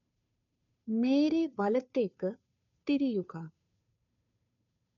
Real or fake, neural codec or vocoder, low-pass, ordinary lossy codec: fake; codec, 16 kHz, 2 kbps, FunCodec, trained on Chinese and English, 25 frames a second; 7.2 kHz; none